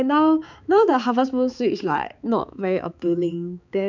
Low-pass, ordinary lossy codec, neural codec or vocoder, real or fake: 7.2 kHz; none; codec, 16 kHz, 4 kbps, X-Codec, HuBERT features, trained on balanced general audio; fake